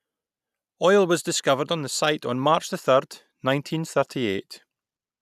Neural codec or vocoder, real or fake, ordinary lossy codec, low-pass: none; real; none; 14.4 kHz